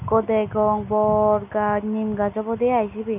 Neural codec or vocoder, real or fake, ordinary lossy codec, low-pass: none; real; none; 3.6 kHz